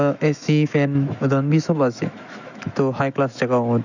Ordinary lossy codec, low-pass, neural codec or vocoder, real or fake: none; 7.2 kHz; autoencoder, 48 kHz, 128 numbers a frame, DAC-VAE, trained on Japanese speech; fake